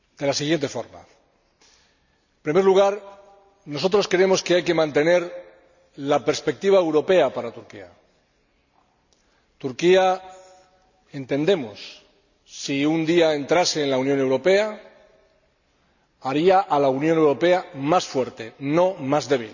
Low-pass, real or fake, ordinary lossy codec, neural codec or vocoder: 7.2 kHz; real; none; none